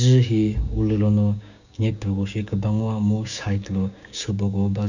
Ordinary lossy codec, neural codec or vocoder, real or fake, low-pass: none; codec, 16 kHz, 6 kbps, DAC; fake; 7.2 kHz